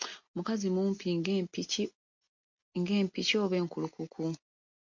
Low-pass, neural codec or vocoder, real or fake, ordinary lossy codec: 7.2 kHz; none; real; MP3, 48 kbps